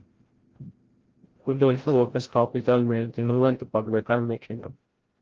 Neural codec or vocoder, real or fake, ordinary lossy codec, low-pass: codec, 16 kHz, 0.5 kbps, FreqCodec, larger model; fake; Opus, 16 kbps; 7.2 kHz